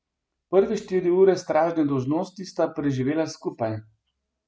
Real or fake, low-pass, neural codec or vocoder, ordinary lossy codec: real; none; none; none